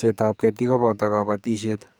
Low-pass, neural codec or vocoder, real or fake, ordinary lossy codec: none; codec, 44.1 kHz, 2.6 kbps, SNAC; fake; none